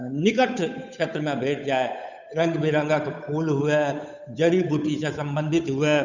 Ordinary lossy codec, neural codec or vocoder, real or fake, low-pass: none; codec, 16 kHz, 8 kbps, FunCodec, trained on Chinese and English, 25 frames a second; fake; 7.2 kHz